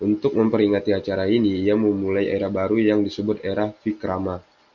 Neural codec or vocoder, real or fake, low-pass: none; real; 7.2 kHz